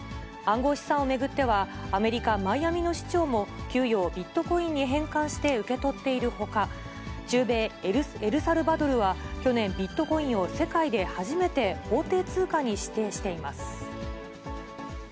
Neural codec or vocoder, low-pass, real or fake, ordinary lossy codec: none; none; real; none